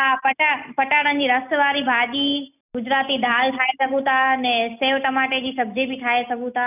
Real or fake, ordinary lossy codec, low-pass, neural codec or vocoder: real; none; 3.6 kHz; none